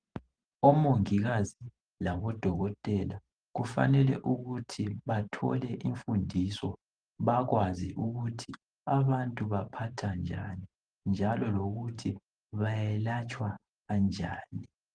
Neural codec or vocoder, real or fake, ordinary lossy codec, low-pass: vocoder, 44.1 kHz, 128 mel bands every 512 samples, BigVGAN v2; fake; Opus, 24 kbps; 9.9 kHz